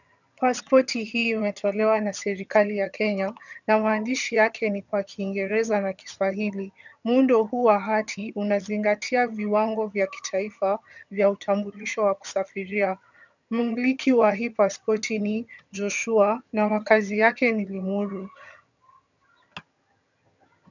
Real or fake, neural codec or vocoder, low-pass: fake; vocoder, 22.05 kHz, 80 mel bands, HiFi-GAN; 7.2 kHz